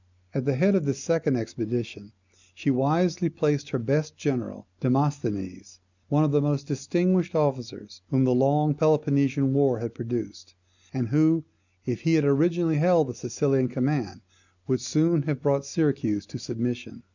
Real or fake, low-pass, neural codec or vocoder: real; 7.2 kHz; none